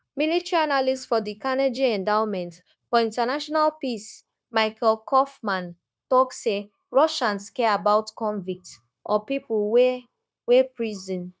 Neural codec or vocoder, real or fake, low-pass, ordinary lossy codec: codec, 16 kHz, 0.9 kbps, LongCat-Audio-Codec; fake; none; none